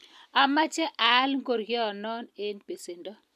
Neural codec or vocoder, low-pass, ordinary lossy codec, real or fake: none; 14.4 kHz; MP3, 96 kbps; real